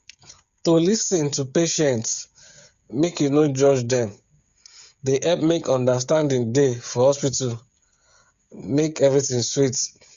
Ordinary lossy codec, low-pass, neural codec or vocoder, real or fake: Opus, 64 kbps; 7.2 kHz; codec, 16 kHz, 8 kbps, FreqCodec, smaller model; fake